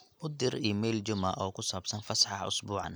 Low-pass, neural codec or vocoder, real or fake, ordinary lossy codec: none; none; real; none